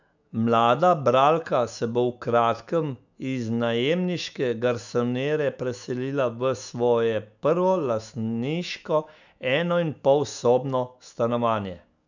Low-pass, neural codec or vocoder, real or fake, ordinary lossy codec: 7.2 kHz; autoencoder, 48 kHz, 128 numbers a frame, DAC-VAE, trained on Japanese speech; fake; none